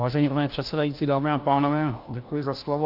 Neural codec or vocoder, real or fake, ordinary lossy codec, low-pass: codec, 16 kHz, 1 kbps, FunCodec, trained on LibriTTS, 50 frames a second; fake; Opus, 32 kbps; 5.4 kHz